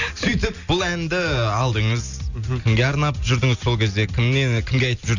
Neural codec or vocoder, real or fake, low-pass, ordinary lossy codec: none; real; 7.2 kHz; none